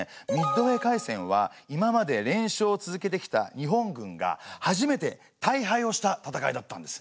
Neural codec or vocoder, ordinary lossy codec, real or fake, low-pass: none; none; real; none